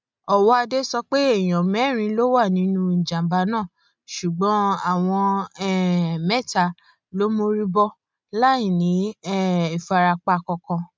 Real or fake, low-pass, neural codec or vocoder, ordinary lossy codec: real; none; none; none